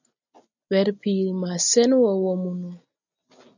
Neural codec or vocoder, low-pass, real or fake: none; 7.2 kHz; real